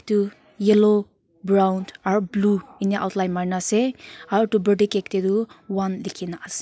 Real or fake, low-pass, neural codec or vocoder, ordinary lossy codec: real; none; none; none